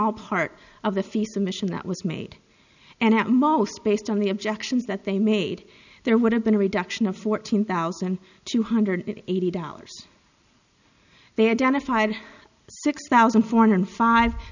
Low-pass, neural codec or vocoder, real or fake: 7.2 kHz; none; real